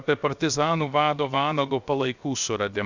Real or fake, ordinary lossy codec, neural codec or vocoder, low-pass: fake; Opus, 64 kbps; codec, 16 kHz, 0.7 kbps, FocalCodec; 7.2 kHz